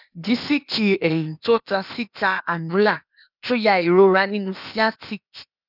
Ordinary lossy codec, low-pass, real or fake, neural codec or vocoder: none; 5.4 kHz; fake; codec, 16 kHz, 0.8 kbps, ZipCodec